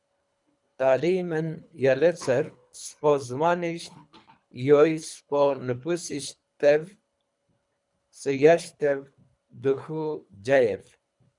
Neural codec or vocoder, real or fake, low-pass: codec, 24 kHz, 3 kbps, HILCodec; fake; 10.8 kHz